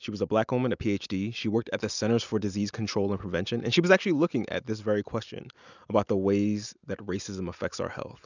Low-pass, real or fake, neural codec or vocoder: 7.2 kHz; real; none